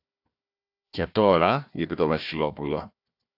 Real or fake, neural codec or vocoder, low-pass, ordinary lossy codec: fake; codec, 16 kHz, 1 kbps, FunCodec, trained on Chinese and English, 50 frames a second; 5.4 kHz; AAC, 48 kbps